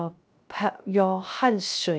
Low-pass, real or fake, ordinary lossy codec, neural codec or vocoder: none; fake; none; codec, 16 kHz, 0.3 kbps, FocalCodec